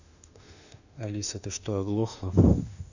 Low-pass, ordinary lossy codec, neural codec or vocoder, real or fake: 7.2 kHz; none; autoencoder, 48 kHz, 32 numbers a frame, DAC-VAE, trained on Japanese speech; fake